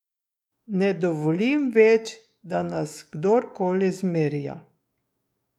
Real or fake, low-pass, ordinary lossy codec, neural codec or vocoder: fake; 19.8 kHz; none; codec, 44.1 kHz, 7.8 kbps, DAC